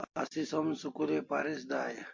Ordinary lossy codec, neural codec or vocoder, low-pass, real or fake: MP3, 48 kbps; none; 7.2 kHz; real